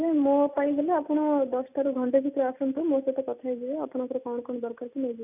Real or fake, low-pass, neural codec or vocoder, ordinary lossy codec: real; 3.6 kHz; none; none